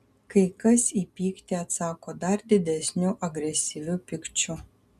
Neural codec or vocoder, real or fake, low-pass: none; real; 14.4 kHz